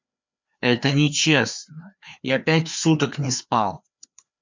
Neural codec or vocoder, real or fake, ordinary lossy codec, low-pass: codec, 16 kHz, 2 kbps, FreqCodec, larger model; fake; MP3, 64 kbps; 7.2 kHz